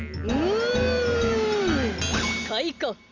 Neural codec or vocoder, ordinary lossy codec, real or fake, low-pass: none; none; real; 7.2 kHz